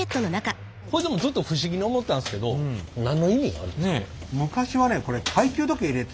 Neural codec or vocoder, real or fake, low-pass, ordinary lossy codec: none; real; none; none